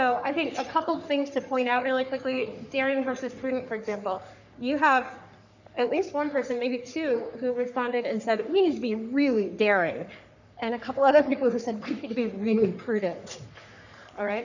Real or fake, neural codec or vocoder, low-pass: fake; codec, 44.1 kHz, 3.4 kbps, Pupu-Codec; 7.2 kHz